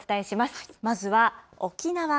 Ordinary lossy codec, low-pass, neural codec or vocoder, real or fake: none; none; none; real